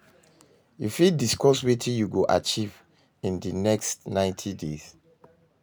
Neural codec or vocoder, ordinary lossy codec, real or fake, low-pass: none; none; real; none